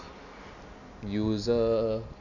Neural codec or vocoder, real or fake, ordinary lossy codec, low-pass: none; real; none; 7.2 kHz